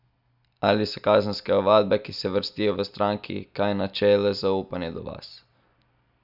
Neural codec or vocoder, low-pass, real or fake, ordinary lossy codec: none; 5.4 kHz; real; none